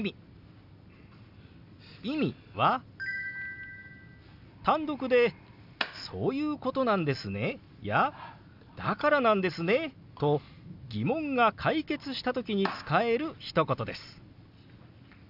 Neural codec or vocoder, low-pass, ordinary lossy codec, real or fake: none; 5.4 kHz; none; real